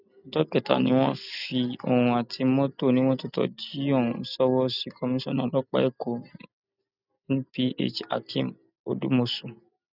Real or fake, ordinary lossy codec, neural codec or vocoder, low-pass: real; none; none; 5.4 kHz